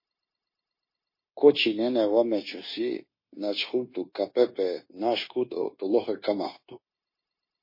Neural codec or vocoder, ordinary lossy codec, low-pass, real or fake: codec, 16 kHz, 0.9 kbps, LongCat-Audio-Codec; MP3, 24 kbps; 5.4 kHz; fake